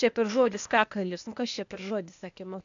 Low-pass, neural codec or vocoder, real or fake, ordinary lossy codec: 7.2 kHz; codec, 16 kHz, 0.8 kbps, ZipCodec; fake; AAC, 64 kbps